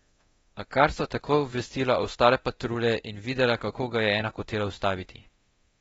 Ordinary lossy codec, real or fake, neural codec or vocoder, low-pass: AAC, 24 kbps; fake; codec, 24 kHz, 0.9 kbps, DualCodec; 10.8 kHz